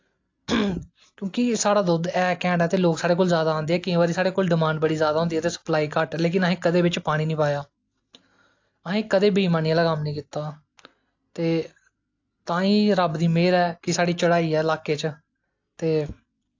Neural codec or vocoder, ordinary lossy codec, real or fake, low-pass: none; AAC, 48 kbps; real; 7.2 kHz